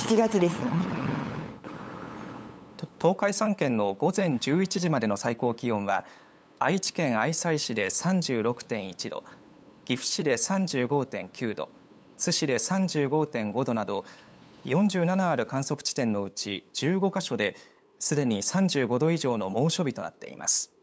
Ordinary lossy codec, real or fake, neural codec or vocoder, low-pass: none; fake; codec, 16 kHz, 8 kbps, FunCodec, trained on LibriTTS, 25 frames a second; none